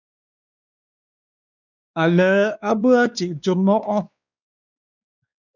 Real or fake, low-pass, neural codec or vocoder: fake; 7.2 kHz; codec, 16 kHz, 2 kbps, X-Codec, WavLM features, trained on Multilingual LibriSpeech